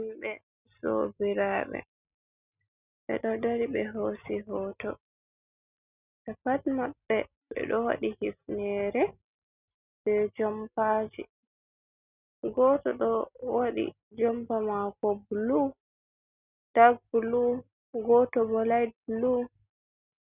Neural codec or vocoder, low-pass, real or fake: none; 3.6 kHz; real